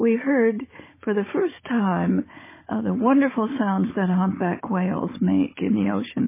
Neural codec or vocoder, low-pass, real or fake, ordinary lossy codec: codec, 16 kHz, 16 kbps, FunCodec, trained on Chinese and English, 50 frames a second; 3.6 kHz; fake; MP3, 16 kbps